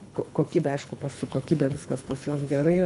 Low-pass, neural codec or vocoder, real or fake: 10.8 kHz; codec, 24 kHz, 3 kbps, HILCodec; fake